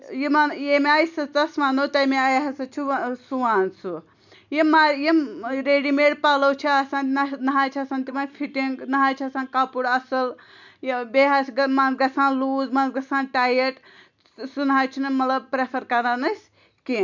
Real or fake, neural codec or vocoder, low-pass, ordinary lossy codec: real; none; 7.2 kHz; none